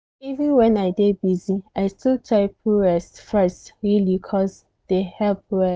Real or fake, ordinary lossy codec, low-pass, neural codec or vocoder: real; none; none; none